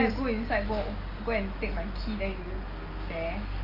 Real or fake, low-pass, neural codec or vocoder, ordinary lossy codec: real; 5.4 kHz; none; Opus, 32 kbps